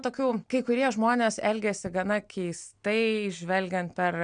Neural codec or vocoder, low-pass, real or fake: none; 9.9 kHz; real